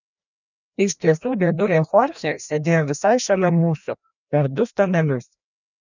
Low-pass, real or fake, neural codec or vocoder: 7.2 kHz; fake; codec, 16 kHz, 1 kbps, FreqCodec, larger model